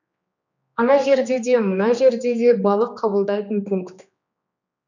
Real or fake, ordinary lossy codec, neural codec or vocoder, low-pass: fake; none; codec, 16 kHz, 2 kbps, X-Codec, HuBERT features, trained on general audio; 7.2 kHz